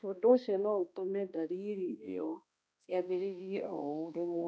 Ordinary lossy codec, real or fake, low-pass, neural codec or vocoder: none; fake; none; codec, 16 kHz, 1 kbps, X-Codec, HuBERT features, trained on balanced general audio